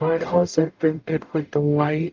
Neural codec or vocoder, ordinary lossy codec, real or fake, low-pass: codec, 44.1 kHz, 0.9 kbps, DAC; Opus, 24 kbps; fake; 7.2 kHz